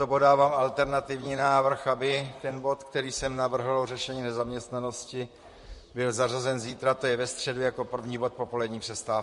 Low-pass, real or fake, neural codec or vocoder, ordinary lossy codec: 14.4 kHz; fake; vocoder, 44.1 kHz, 128 mel bands, Pupu-Vocoder; MP3, 48 kbps